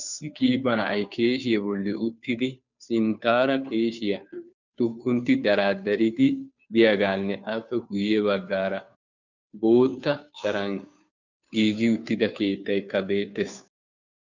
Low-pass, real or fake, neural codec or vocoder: 7.2 kHz; fake; codec, 16 kHz, 2 kbps, FunCodec, trained on Chinese and English, 25 frames a second